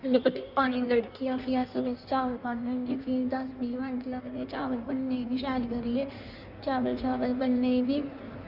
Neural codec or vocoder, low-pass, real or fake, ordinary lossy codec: codec, 16 kHz in and 24 kHz out, 1.1 kbps, FireRedTTS-2 codec; 5.4 kHz; fake; none